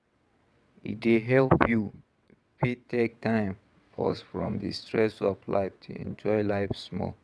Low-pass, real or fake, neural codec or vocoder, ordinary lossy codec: none; fake; vocoder, 22.05 kHz, 80 mel bands, WaveNeXt; none